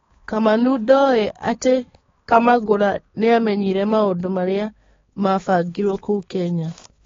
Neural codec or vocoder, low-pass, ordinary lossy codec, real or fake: codec, 16 kHz, 4 kbps, X-Codec, HuBERT features, trained on balanced general audio; 7.2 kHz; AAC, 24 kbps; fake